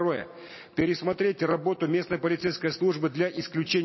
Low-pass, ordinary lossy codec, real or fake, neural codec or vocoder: 7.2 kHz; MP3, 24 kbps; real; none